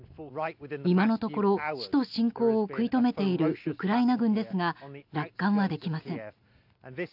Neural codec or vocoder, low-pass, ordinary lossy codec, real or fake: none; 5.4 kHz; AAC, 48 kbps; real